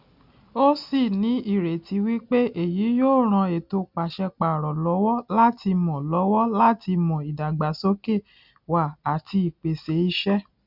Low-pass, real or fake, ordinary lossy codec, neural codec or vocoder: 5.4 kHz; real; none; none